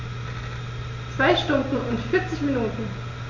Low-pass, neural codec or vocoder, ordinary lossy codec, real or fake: 7.2 kHz; none; none; real